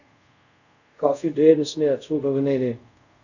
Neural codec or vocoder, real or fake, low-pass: codec, 24 kHz, 0.5 kbps, DualCodec; fake; 7.2 kHz